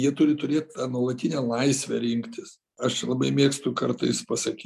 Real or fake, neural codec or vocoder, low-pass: real; none; 14.4 kHz